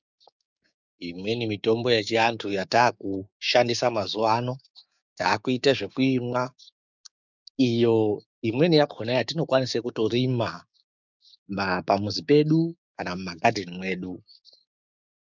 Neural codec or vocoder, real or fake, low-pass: codec, 16 kHz, 6 kbps, DAC; fake; 7.2 kHz